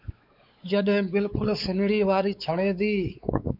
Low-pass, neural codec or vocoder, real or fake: 5.4 kHz; codec, 16 kHz, 4 kbps, X-Codec, WavLM features, trained on Multilingual LibriSpeech; fake